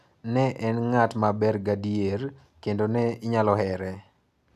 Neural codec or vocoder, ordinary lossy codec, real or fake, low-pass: none; none; real; 14.4 kHz